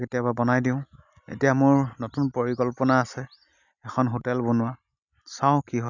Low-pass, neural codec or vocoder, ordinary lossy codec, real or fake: 7.2 kHz; none; none; real